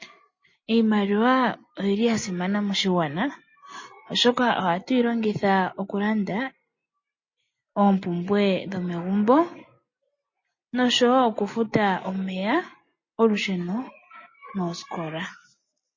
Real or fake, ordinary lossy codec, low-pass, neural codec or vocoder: real; MP3, 32 kbps; 7.2 kHz; none